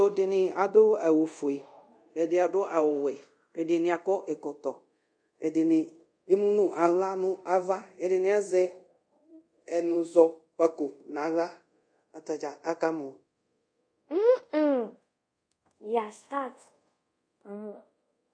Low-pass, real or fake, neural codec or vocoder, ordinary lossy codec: 9.9 kHz; fake; codec, 24 kHz, 0.5 kbps, DualCodec; MP3, 48 kbps